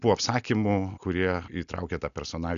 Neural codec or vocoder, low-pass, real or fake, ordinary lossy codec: none; 7.2 kHz; real; MP3, 96 kbps